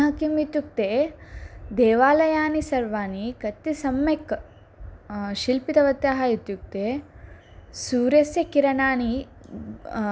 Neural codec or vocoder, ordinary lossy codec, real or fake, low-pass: none; none; real; none